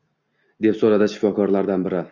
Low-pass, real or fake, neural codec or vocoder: 7.2 kHz; real; none